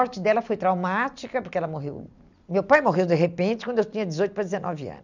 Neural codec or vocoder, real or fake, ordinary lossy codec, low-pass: none; real; none; 7.2 kHz